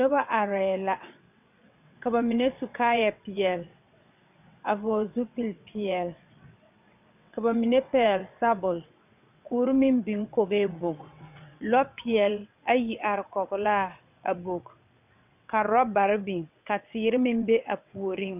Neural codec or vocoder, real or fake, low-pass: vocoder, 22.05 kHz, 80 mel bands, WaveNeXt; fake; 3.6 kHz